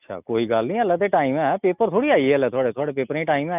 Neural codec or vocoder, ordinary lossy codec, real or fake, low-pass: none; none; real; 3.6 kHz